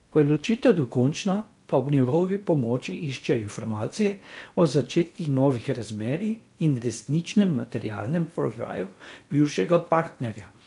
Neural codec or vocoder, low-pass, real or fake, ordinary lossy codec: codec, 16 kHz in and 24 kHz out, 0.8 kbps, FocalCodec, streaming, 65536 codes; 10.8 kHz; fake; MP3, 64 kbps